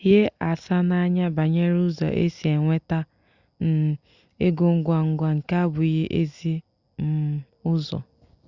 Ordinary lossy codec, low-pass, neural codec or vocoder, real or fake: Opus, 64 kbps; 7.2 kHz; none; real